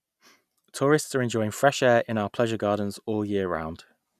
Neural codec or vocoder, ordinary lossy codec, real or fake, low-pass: none; none; real; 14.4 kHz